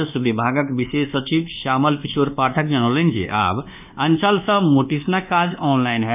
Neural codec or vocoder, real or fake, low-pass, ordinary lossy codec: codec, 24 kHz, 1.2 kbps, DualCodec; fake; 3.6 kHz; none